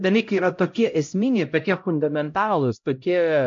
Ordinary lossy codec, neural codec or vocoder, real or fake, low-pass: MP3, 48 kbps; codec, 16 kHz, 0.5 kbps, X-Codec, HuBERT features, trained on LibriSpeech; fake; 7.2 kHz